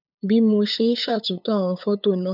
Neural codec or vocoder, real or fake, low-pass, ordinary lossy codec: codec, 16 kHz, 8 kbps, FunCodec, trained on LibriTTS, 25 frames a second; fake; 5.4 kHz; none